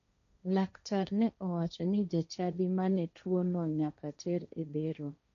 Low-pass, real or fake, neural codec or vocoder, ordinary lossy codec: 7.2 kHz; fake; codec, 16 kHz, 1.1 kbps, Voila-Tokenizer; none